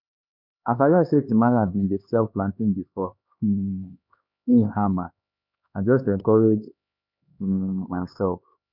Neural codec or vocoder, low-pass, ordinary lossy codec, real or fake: codec, 16 kHz, 2 kbps, X-Codec, HuBERT features, trained on LibriSpeech; 5.4 kHz; none; fake